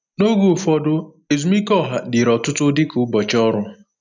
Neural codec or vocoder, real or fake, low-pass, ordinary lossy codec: none; real; 7.2 kHz; none